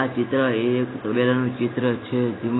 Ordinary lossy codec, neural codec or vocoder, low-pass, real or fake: AAC, 16 kbps; none; 7.2 kHz; real